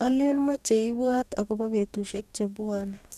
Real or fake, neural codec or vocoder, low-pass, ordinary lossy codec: fake; codec, 44.1 kHz, 2.6 kbps, DAC; 14.4 kHz; none